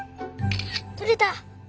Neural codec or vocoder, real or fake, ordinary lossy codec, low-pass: none; real; none; none